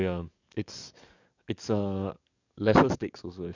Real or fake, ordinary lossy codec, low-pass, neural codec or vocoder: fake; none; 7.2 kHz; codec, 44.1 kHz, 7.8 kbps, DAC